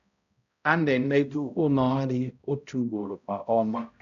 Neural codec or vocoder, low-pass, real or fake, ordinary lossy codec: codec, 16 kHz, 0.5 kbps, X-Codec, HuBERT features, trained on balanced general audio; 7.2 kHz; fake; none